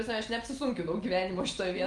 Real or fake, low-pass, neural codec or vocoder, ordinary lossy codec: real; 10.8 kHz; none; Opus, 24 kbps